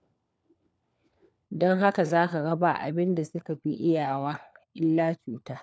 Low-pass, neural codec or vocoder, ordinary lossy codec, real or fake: none; codec, 16 kHz, 4 kbps, FunCodec, trained on LibriTTS, 50 frames a second; none; fake